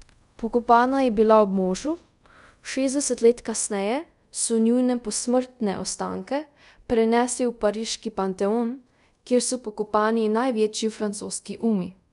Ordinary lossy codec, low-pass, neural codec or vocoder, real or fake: none; 10.8 kHz; codec, 24 kHz, 0.5 kbps, DualCodec; fake